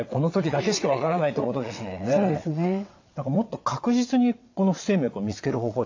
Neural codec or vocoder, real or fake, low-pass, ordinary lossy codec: vocoder, 22.05 kHz, 80 mel bands, WaveNeXt; fake; 7.2 kHz; AAC, 48 kbps